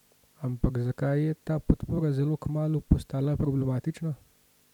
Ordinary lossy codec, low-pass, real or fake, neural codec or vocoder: none; 19.8 kHz; fake; vocoder, 44.1 kHz, 128 mel bands every 256 samples, BigVGAN v2